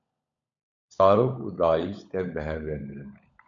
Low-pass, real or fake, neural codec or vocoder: 7.2 kHz; fake; codec, 16 kHz, 16 kbps, FunCodec, trained on LibriTTS, 50 frames a second